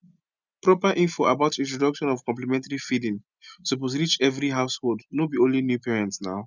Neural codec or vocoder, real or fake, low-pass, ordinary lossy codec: none; real; 7.2 kHz; none